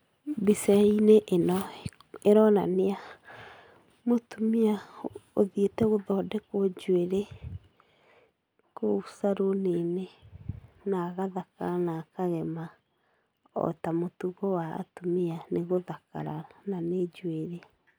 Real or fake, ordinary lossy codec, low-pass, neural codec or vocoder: real; none; none; none